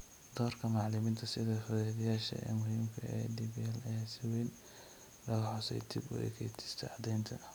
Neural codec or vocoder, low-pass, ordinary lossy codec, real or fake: none; none; none; real